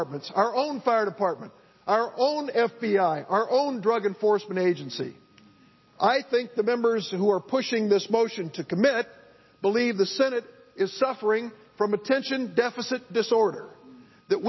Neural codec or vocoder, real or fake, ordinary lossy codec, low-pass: none; real; MP3, 24 kbps; 7.2 kHz